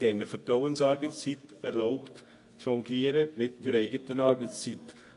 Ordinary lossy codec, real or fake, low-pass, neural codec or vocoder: AAC, 48 kbps; fake; 10.8 kHz; codec, 24 kHz, 0.9 kbps, WavTokenizer, medium music audio release